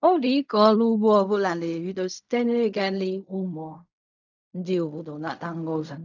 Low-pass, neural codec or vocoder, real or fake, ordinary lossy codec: 7.2 kHz; codec, 16 kHz in and 24 kHz out, 0.4 kbps, LongCat-Audio-Codec, fine tuned four codebook decoder; fake; none